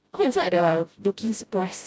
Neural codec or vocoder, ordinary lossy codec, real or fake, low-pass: codec, 16 kHz, 0.5 kbps, FreqCodec, smaller model; none; fake; none